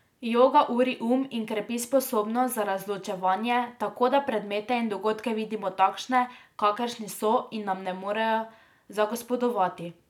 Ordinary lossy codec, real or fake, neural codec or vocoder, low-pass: none; real; none; 19.8 kHz